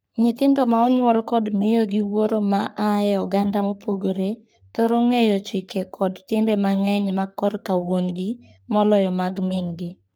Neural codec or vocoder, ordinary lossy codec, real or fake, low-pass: codec, 44.1 kHz, 3.4 kbps, Pupu-Codec; none; fake; none